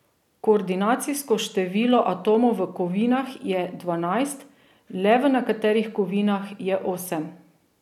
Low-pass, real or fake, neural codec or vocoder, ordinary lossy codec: 19.8 kHz; real; none; none